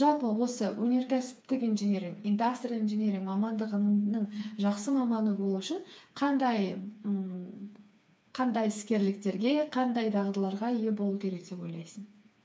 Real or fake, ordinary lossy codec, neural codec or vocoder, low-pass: fake; none; codec, 16 kHz, 4 kbps, FreqCodec, smaller model; none